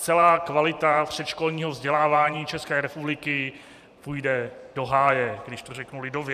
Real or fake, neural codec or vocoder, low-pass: fake; vocoder, 44.1 kHz, 128 mel bands every 512 samples, BigVGAN v2; 14.4 kHz